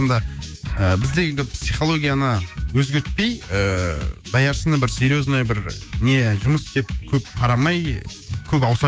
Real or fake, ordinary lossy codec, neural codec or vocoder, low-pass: fake; none; codec, 16 kHz, 6 kbps, DAC; none